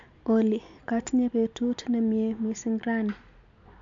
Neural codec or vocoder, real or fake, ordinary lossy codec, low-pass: none; real; MP3, 64 kbps; 7.2 kHz